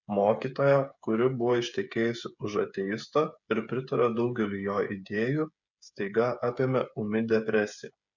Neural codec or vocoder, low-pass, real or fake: codec, 16 kHz, 8 kbps, FreqCodec, smaller model; 7.2 kHz; fake